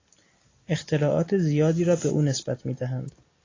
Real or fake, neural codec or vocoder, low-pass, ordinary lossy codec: real; none; 7.2 kHz; AAC, 32 kbps